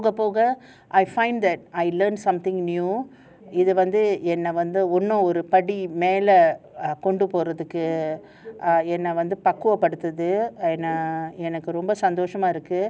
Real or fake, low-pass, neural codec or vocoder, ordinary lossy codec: real; none; none; none